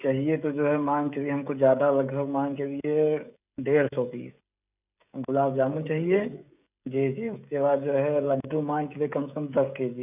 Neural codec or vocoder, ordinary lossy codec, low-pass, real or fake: codec, 16 kHz, 16 kbps, FreqCodec, smaller model; none; 3.6 kHz; fake